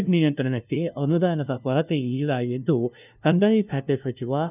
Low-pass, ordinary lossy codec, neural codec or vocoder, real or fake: 3.6 kHz; none; codec, 16 kHz, 0.5 kbps, FunCodec, trained on LibriTTS, 25 frames a second; fake